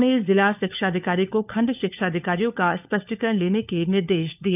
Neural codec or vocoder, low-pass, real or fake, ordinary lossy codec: codec, 16 kHz, 4 kbps, FunCodec, trained on LibriTTS, 50 frames a second; 3.6 kHz; fake; none